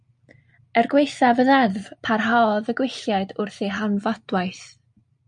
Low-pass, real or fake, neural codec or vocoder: 9.9 kHz; real; none